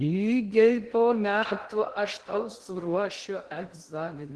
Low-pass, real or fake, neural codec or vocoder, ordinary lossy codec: 10.8 kHz; fake; codec, 16 kHz in and 24 kHz out, 0.6 kbps, FocalCodec, streaming, 2048 codes; Opus, 16 kbps